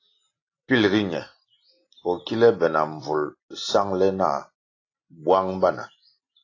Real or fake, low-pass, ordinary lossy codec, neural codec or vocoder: real; 7.2 kHz; AAC, 32 kbps; none